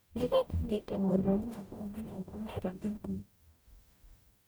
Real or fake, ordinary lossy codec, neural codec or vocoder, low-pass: fake; none; codec, 44.1 kHz, 0.9 kbps, DAC; none